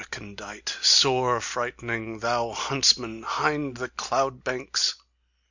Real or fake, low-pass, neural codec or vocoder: real; 7.2 kHz; none